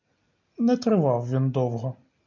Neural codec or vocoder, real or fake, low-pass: none; real; 7.2 kHz